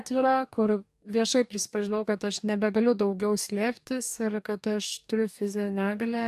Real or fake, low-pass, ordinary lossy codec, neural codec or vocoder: fake; 14.4 kHz; AAC, 96 kbps; codec, 44.1 kHz, 2.6 kbps, DAC